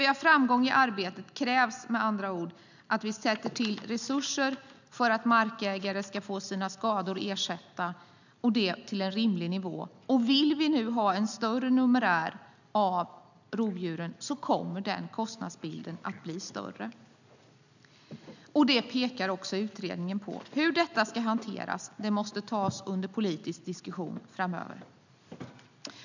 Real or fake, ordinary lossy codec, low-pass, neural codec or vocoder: real; none; 7.2 kHz; none